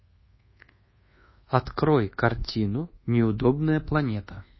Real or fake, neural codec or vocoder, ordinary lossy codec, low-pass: fake; codec, 24 kHz, 1.2 kbps, DualCodec; MP3, 24 kbps; 7.2 kHz